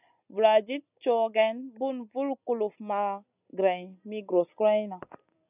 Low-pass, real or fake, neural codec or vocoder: 3.6 kHz; real; none